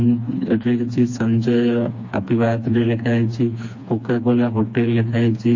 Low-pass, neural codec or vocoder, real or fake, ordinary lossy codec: 7.2 kHz; codec, 16 kHz, 2 kbps, FreqCodec, smaller model; fake; MP3, 32 kbps